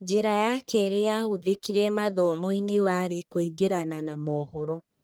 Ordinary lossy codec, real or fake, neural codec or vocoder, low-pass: none; fake; codec, 44.1 kHz, 1.7 kbps, Pupu-Codec; none